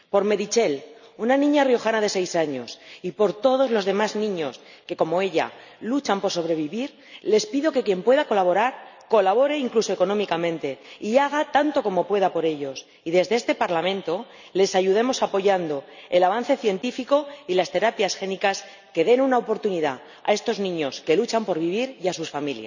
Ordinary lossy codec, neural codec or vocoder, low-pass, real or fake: none; none; 7.2 kHz; real